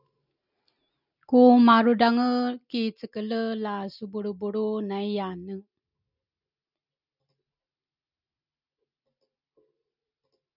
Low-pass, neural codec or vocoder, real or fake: 5.4 kHz; none; real